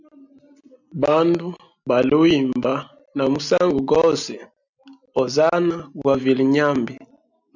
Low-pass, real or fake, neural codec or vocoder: 7.2 kHz; real; none